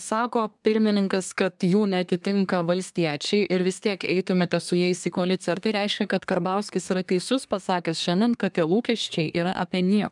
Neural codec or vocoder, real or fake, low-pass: codec, 24 kHz, 1 kbps, SNAC; fake; 10.8 kHz